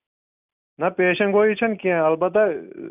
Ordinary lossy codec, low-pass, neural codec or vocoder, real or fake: none; 3.6 kHz; none; real